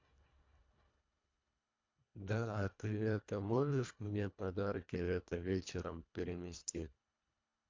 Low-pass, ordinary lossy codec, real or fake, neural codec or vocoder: 7.2 kHz; MP3, 64 kbps; fake; codec, 24 kHz, 1.5 kbps, HILCodec